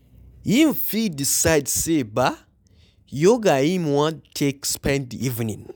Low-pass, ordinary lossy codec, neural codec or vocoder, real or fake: none; none; none; real